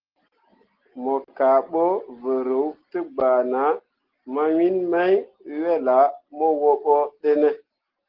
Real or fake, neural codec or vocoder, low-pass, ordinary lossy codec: real; none; 5.4 kHz; Opus, 16 kbps